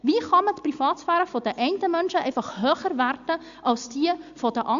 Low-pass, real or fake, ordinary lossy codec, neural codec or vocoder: 7.2 kHz; real; Opus, 64 kbps; none